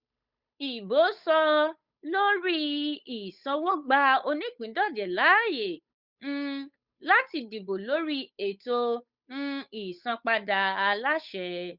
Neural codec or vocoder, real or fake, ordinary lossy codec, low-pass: codec, 16 kHz, 8 kbps, FunCodec, trained on Chinese and English, 25 frames a second; fake; Opus, 64 kbps; 5.4 kHz